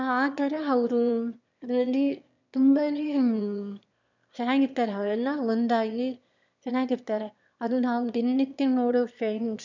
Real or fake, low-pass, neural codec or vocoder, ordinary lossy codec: fake; 7.2 kHz; autoencoder, 22.05 kHz, a latent of 192 numbers a frame, VITS, trained on one speaker; none